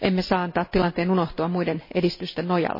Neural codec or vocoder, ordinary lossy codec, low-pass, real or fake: none; MP3, 24 kbps; 5.4 kHz; real